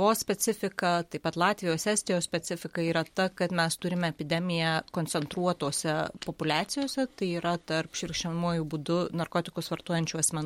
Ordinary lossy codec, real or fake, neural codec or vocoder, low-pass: MP3, 64 kbps; real; none; 19.8 kHz